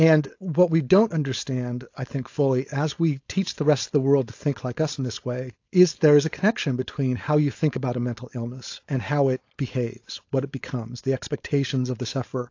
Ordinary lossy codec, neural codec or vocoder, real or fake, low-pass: AAC, 48 kbps; codec, 16 kHz, 4.8 kbps, FACodec; fake; 7.2 kHz